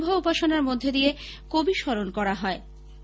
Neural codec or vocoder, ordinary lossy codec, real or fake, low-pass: none; none; real; none